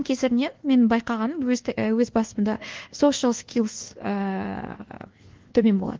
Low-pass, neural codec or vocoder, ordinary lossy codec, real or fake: 7.2 kHz; codec, 24 kHz, 1.2 kbps, DualCodec; Opus, 16 kbps; fake